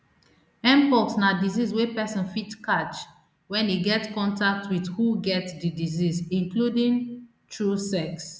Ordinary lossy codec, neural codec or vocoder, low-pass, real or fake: none; none; none; real